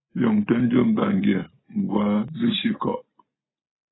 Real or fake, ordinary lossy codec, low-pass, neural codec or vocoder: real; AAC, 16 kbps; 7.2 kHz; none